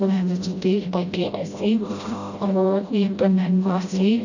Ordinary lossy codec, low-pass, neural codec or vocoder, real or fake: none; 7.2 kHz; codec, 16 kHz, 0.5 kbps, FreqCodec, smaller model; fake